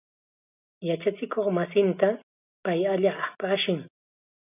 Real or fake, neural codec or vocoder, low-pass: real; none; 3.6 kHz